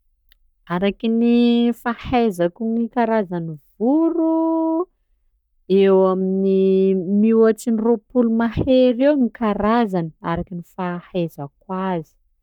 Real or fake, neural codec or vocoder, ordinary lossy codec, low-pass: fake; codec, 44.1 kHz, 7.8 kbps, Pupu-Codec; none; 19.8 kHz